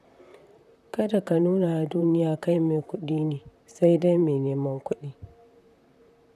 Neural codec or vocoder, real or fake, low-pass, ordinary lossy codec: vocoder, 44.1 kHz, 128 mel bands, Pupu-Vocoder; fake; 14.4 kHz; none